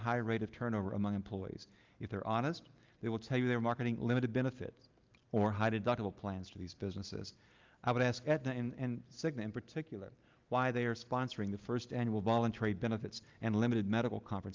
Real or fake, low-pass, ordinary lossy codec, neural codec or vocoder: real; 7.2 kHz; Opus, 24 kbps; none